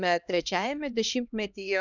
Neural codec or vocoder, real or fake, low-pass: codec, 16 kHz, 1 kbps, X-Codec, HuBERT features, trained on LibriSpeech; fake; 7.2 kHz